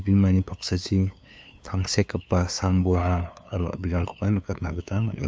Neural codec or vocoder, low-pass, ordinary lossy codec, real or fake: codec, 16 kHz, 2 kbps, FunCodec, trained on LibriTTS, 25 frames a second; none; none; fake